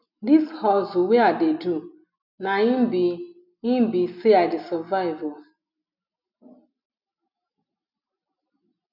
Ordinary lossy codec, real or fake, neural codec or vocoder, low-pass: none; real; none; 5.4 kHz